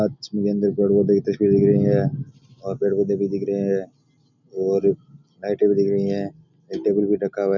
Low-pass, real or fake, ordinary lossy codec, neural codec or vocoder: 7.2 kHz; real; none; none